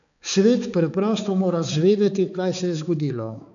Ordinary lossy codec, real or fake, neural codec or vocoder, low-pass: none; fake; codec, 16 kHz, 4 kbps, X-Codec, HuBERT features, trained on balanced general audio; 7.2 kHz